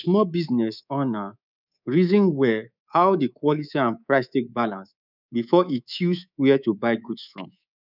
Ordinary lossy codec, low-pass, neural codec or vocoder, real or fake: none; 5.4 kHz; codec, 24 kHz, 3.1 kbps, DualCodec; fake